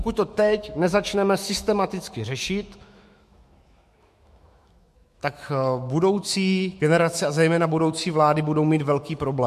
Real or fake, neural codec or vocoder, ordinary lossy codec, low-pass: fake; autoencoder, 48 kHz, 128 numbers a frame, DAC-VAE, trained on Japanese speech; MP3, 64 kbps; 14.4 kHz